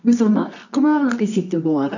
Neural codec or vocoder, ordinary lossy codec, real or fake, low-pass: codec, 24 kHz, 0.9 kbps, WavTokenizer, medium music audio release; none; fake; 7.2 kHz